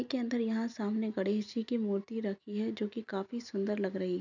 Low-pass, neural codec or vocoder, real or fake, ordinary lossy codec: 7.2 kHz; none; real; none